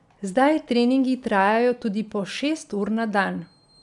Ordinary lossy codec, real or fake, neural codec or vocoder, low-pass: none; real; none; 10.8 kHz